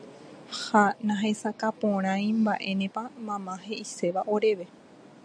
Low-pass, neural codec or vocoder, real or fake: 9.9 kHz; none; real